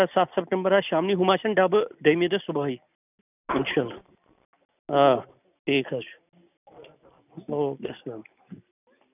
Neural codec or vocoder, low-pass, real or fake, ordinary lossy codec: none; 3.6 kHz; real; none